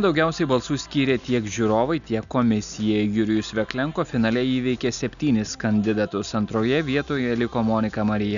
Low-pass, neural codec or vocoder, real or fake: 7.2 kHz; none; real